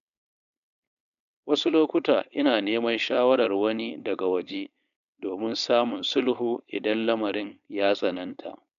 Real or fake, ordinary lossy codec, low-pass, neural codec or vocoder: fake; none; 7.2 kHz; codec, 16 kHz, 4.8 kbps, FACodec